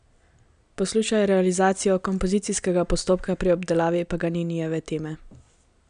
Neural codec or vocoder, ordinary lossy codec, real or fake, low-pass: none; none; real; 9.9 kHz